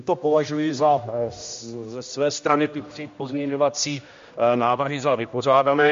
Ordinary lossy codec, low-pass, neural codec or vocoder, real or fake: MP3, 48 kbps; 7.2 kHz; codec, 16 kHz, 1 kbps, X-Codec, HuBERT features, trained on general audio; fake